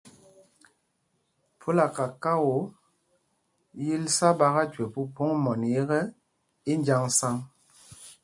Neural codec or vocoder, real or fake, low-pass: none; real; 10.8 kHz